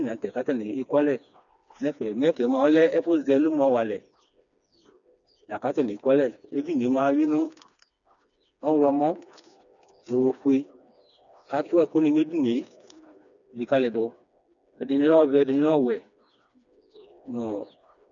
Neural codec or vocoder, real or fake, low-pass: codec, 16 kHz, 2 kbps, FreqCodec, smaller model; fake; 7.2 kHz